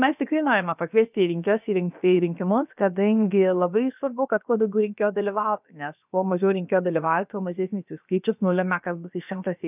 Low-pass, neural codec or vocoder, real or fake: 3.6 kHz; codec, 16 kHz, about 1 kbps, DyCAST, with the encoder's durations; fake